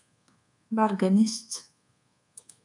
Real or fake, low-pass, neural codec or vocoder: fake; 10.8 kHz; codec, 24 kHz, 1.2 kbps, DualCodec